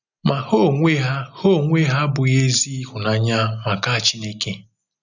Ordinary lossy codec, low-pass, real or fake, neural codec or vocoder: none; 7.2 kHz; real; none